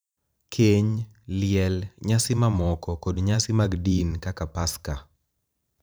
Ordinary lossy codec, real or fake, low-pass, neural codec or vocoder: none; fake; none; vocoder, 44.1 kHz, 128 mel bands every 512 samples, BigVGAN v2